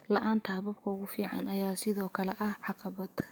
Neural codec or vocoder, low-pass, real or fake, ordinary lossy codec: codec, 44.1 kHz, 7.8 kbps, DAC; none; fake; none